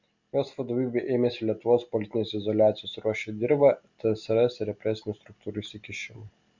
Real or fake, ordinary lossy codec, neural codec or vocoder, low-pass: real; Opus, 64 kbps; none; 7.2 kHz